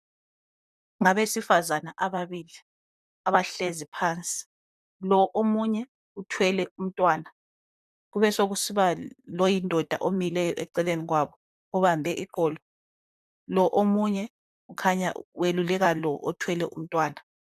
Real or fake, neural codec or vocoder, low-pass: fake; vocoder, 44.1 kHz, 128 mel bands, Pupu-Vocoder; 14.4 kHz